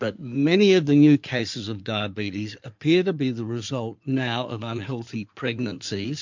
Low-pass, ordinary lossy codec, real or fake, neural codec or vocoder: 7.2 kHz; MP3, 48 kbps; fake; codec, 16 kHz in and 24 kHz out, 2.2 kbps, FireRedTTS-2 codec